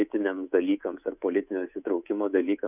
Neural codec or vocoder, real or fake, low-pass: codec, 24 kHz, 3.1 kbps, DualCodec; fake; 3.6 kHz